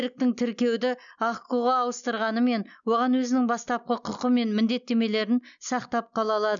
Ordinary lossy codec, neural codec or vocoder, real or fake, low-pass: none; none; real; 7.2 kHz